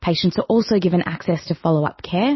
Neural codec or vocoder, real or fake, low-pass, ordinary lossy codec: none; real; 7.2 kHz; MP3, 24 kbps